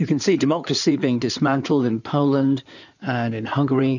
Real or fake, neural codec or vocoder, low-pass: fake; codec, 16 kHz in and 24 kHz out, 2.2 kbps, FireRedTTS-2 codec; 7.2 kHz